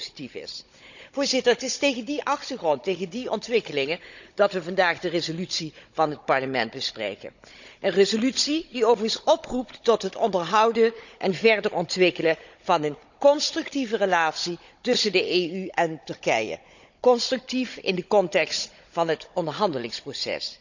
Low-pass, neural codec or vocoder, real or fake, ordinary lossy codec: 7.2 kHz; codec, 16 kHz, 16 kbps, FunCodec, trained on Chinese and English, 50 frames a second; fake; none